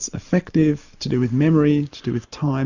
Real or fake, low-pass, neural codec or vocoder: real; 7.2 kHz; none